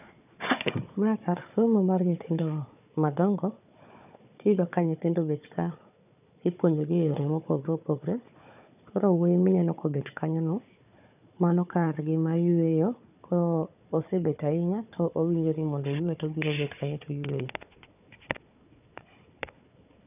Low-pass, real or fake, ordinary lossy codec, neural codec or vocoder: 3.6 kHz; fake; none; codec, 16 kHz, 4 kbps, FunCodec, trained on Chinese and English, 50 frames a second